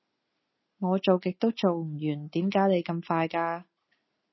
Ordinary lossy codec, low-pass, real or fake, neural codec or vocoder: MP3, 24 kbps; 7.2 kHz; real; none